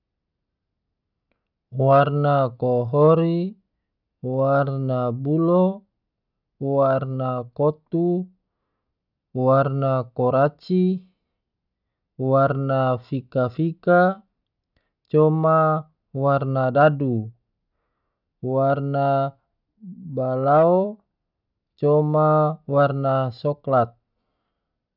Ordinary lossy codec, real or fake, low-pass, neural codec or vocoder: none; real; 5.4 kHz; none